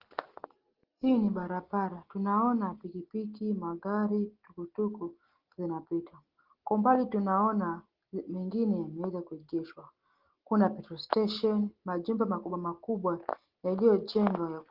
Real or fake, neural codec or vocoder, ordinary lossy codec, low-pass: real; none; Opus, 16 kbps; 5.4 kHz